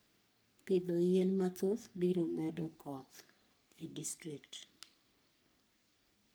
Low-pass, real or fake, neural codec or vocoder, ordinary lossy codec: none; fake; codec, 44.1 kHz, 3.4 kbps, Pupu-Codec; none